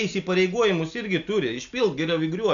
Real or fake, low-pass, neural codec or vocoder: real; 7.2 kHz; none